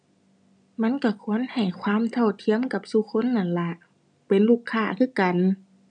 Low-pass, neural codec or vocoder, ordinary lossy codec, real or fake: 9.9 kHz; none; none; real